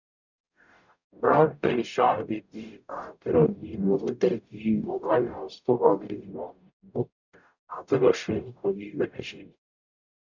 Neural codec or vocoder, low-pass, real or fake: codec, 44.1 kHz, 0.9 kbps, DAC; 7.2 kHz; fake